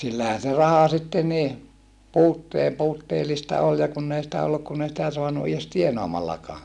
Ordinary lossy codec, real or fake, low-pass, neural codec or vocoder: none; real; none; none